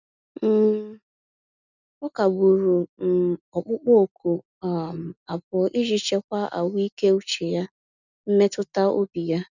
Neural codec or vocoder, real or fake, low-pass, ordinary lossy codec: none; real; 7.2 kHz; none